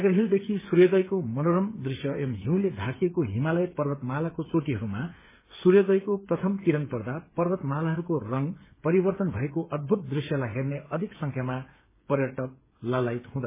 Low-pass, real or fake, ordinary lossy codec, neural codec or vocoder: 3.6 kHz; fake; MP3, 16 kbps; codec, 16 kHz, 8 kbps, FreqCodec, smaller model